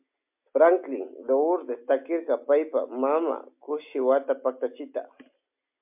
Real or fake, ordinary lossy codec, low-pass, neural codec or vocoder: real; MP3, 32 kbps; 3.6 kHz; none